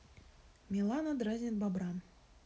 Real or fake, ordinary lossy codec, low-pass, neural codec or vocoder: real; none; none; none